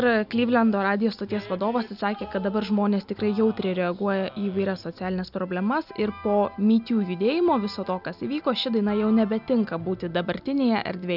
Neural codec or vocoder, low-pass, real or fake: none; 5.4 kHz; real